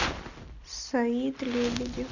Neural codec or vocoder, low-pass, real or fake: none; 7.2 kHz; real